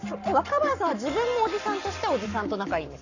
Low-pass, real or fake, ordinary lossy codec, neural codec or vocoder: 7.2 kHz; fake; none; codec, 44.1 kHz, 7.8 kbps, Pupu-Codec